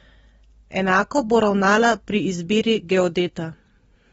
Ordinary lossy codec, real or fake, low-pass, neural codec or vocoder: AAC, 24 kbps; real; 19.8 kHz; none